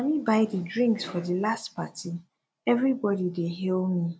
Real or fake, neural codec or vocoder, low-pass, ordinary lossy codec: real; none; none; none